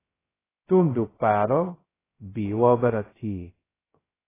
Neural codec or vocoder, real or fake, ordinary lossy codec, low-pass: codec, 16 kHz, 0.2 kbps, FocalCodec; fake; AAC, 16 kbps; 3.6 kHz